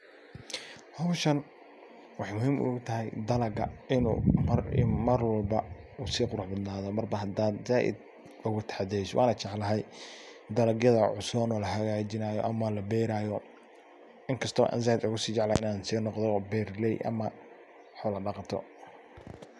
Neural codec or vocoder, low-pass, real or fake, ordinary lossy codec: none; none; real; none